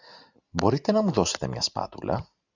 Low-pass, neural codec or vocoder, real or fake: 7.2 kHz; none; real